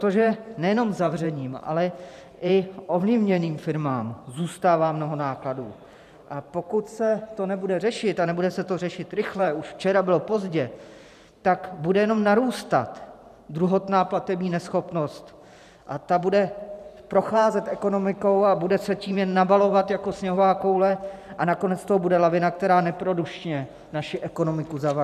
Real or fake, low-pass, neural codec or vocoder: fake; 14.4 kHz; vocoder, 44.1 kHz, 128 mel bands every 512 samples, BigVGAN v2